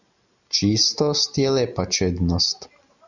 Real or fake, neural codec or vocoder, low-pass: real; none; 7.2 kHz